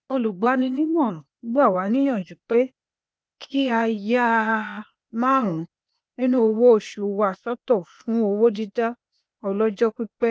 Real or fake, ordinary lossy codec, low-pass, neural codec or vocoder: fake; none; none; codec, 16 kHz, 0.8 kbps, ZipCodec